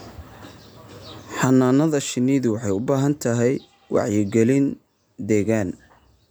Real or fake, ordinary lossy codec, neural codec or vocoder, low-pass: real; none; none; none